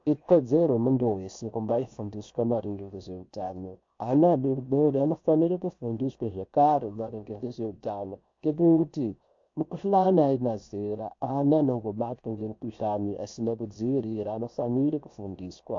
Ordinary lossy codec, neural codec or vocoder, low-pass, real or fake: MP3, 48 kbps; codec, 16 kHz, 0.7 kbps, FocalCodec; 7.2 kHz; fake